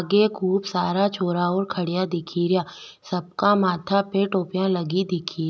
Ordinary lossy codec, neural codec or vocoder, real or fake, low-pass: none; none; real; none